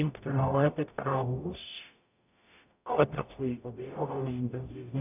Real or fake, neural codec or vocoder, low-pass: fake; codec, 44.1 kHz, 0.9 kbps, DAC; 3.6 kHz